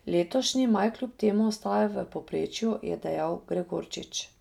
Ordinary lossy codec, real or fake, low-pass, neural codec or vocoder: none; real; 19.8 kHz; none